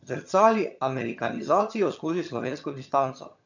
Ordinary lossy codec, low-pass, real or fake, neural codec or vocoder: none; 7.2 kHz; fake; vocoder, 22.05 kHz, 80 mel bands, HiFi-GAN